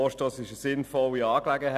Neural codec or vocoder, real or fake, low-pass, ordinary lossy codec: none; real; 14.4 kHz; none